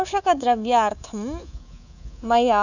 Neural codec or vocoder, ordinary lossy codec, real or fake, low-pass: codec, 24 kHz, 3.1 kbps, DualCodec; none; fake; 7.2 kHz